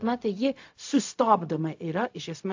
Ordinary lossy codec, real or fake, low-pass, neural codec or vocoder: AAC, 48 kbps; fake; 7.2 kHz; codec, 16 kHz, 0.4 kbps, LongCat-Audio-Codec